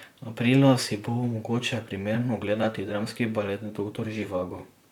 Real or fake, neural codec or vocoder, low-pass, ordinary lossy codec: fake; vocoder, 44.1 kHz, 128 mel bands, Pupu-Vocoder; 19.8 kHz; none